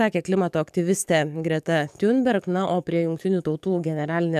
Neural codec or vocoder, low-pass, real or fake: codec, 44.1 kHz, 7.8 kbps, DAC; 14.4 kHz; fake